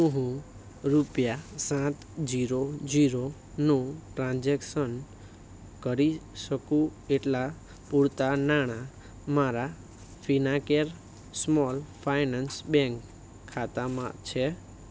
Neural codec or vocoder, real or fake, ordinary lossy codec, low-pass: none; real; none; none